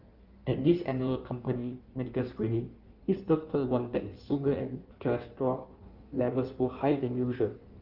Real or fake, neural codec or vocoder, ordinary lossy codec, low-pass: fake; codec, 16 kHz in and 24 kHz out, 1.1 kbps, FireRedTTS-2 codec; Opus, 24 kbps; 5.4 kHz